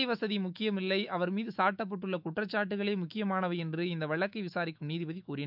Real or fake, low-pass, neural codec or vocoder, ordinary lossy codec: fake; 5.4 kHz; autoencoder, 48 kHz, 128 numbers a frame, DAC-VAE, trained on Japanese speech; none